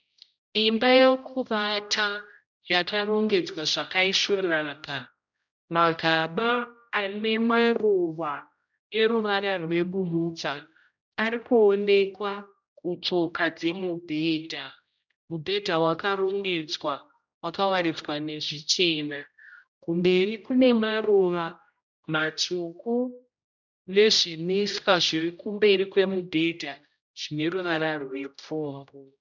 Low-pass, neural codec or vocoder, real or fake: 7.2 kHz; codec, 16 kHz, 0.5 kbps, X-Codec, HuBERT features, trained on general audio; fake